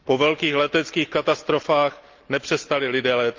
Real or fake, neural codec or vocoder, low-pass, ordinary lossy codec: real; none; 7.2 kHz; Opus, 24 kbps